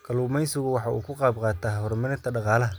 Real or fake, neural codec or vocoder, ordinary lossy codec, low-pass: real; none; none; none